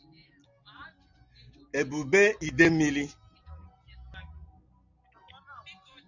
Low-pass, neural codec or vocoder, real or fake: 7.2 kHz; none; real